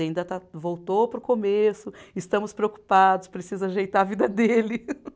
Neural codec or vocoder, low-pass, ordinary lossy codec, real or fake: none; none; none; real